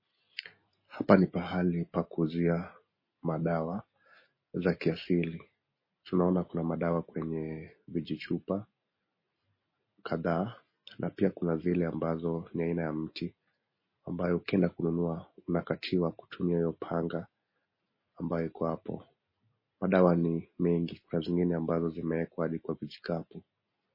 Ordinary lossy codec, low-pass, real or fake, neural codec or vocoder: MP3, 24 kbps; 5.4 kHz; real; none